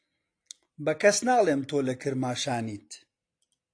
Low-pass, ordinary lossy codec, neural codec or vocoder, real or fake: 9.9 kHz; AAC, 48 kbps; none; real